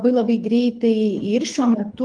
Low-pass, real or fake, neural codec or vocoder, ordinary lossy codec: 9.9 kHz; fake; codec, 24 kHz, 6 kbps, HILCodec; Opus, 24 kbps